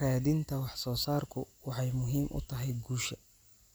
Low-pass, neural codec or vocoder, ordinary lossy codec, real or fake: none; none; none; real